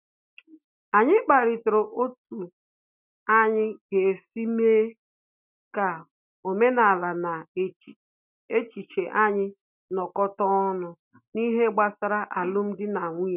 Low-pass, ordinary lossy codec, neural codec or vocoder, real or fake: 3.6 kHz; none; none; real